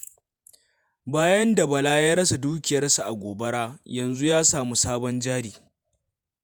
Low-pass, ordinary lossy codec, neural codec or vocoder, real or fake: none; none; vocoder, 48 kHz, 128 mel bands, Vocos; fake